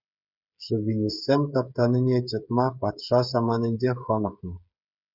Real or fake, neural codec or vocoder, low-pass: fake; codec, 16 kHz, 8 kbps, FreqCodec, smaller model; 5.4 kHz